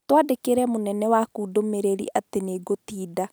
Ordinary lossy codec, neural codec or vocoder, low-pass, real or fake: none; none; none; real